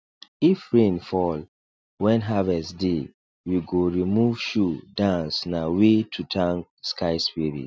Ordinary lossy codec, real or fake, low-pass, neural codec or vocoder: none; real; none; none